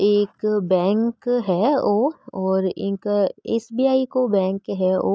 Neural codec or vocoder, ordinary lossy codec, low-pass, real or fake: none; none; none; real